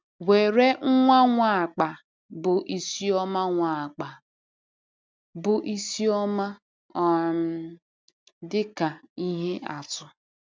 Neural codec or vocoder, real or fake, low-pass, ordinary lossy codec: none; real; none; none